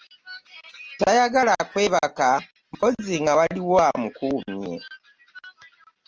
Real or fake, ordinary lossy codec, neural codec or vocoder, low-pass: real; Opus, 24 kbps; none; 7.2 kHz